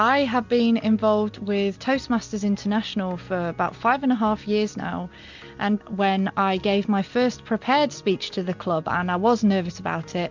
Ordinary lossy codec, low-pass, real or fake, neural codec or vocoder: MP3, 64 kbps; 7.2 kHz; real; none